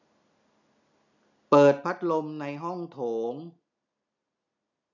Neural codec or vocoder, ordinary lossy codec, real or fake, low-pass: none; none; real; 7.2 kHz